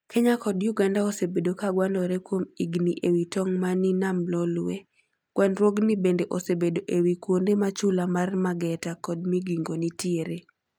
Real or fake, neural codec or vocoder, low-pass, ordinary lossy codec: real; none; 19.8 kHz; none